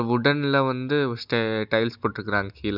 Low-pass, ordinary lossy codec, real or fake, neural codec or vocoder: 5.4 kHz; none; real; none